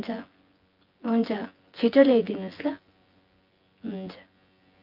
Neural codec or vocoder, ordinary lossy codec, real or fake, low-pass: vocoder, 24 kHz, 100 mel bands, Vocos; Opus, 32 kbps; fake; 5.4 kHz